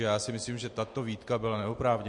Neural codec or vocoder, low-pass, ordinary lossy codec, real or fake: none; 10.8 kHz; MP3, 48 kbps; real